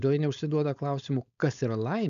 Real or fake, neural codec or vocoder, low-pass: fake; codec, 16 kHz, 4.8 kbps, FACodec; 7.2 kHz